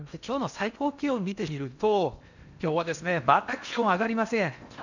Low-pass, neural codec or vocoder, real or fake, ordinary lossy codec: 7.2 kHz; codec, 16 kHz in and 24 kHz out, 0.8 kbps, FocalCodec, streaming, 65536 codes; fake; none